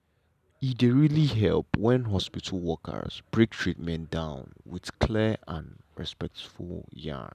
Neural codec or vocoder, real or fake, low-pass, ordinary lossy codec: none; real; 14.4 kHz; none